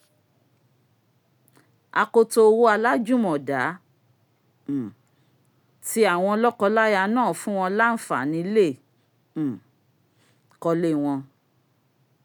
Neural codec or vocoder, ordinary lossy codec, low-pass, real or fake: none; none; none; real